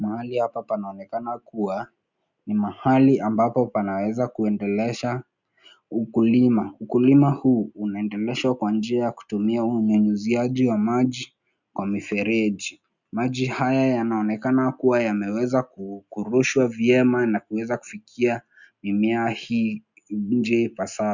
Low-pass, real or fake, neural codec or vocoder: 7.2 kHz; real; none